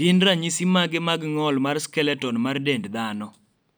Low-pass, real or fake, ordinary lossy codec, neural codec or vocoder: none; real; none; none